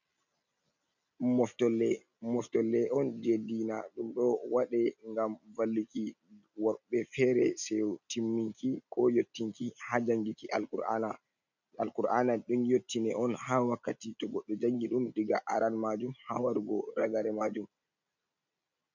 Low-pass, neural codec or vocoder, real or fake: 7.2 kHz; none; real